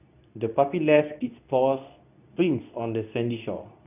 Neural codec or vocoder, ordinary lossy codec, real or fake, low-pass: codec, 24 kHz, 0.9 kbps, WavTokenizer, medium speech release version 2; none; fake; 3.6 kHz